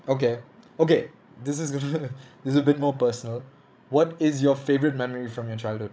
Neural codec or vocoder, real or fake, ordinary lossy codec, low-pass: codec, 16 kHz, 16 kbps, FreqCodec, larger model; fake; none; none